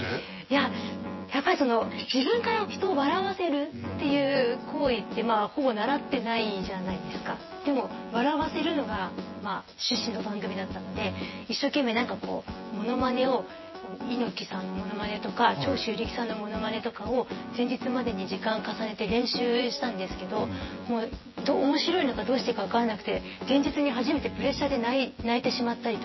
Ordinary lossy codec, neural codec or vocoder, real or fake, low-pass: MP3, 24 kbps; vocoder, 24 kHz, 100 mel bands, Vocos; fake; 7.2 kHz